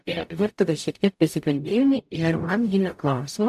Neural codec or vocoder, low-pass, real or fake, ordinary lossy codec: codec, 44.1 kHz, 0.9 kbps, DAC; 14.4 kHz; fake; MP3, 96 kbps